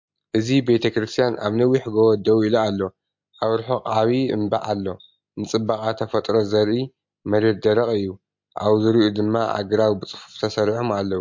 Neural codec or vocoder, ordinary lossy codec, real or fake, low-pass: none; MP3, 48 kbps; real; 7.2 kHz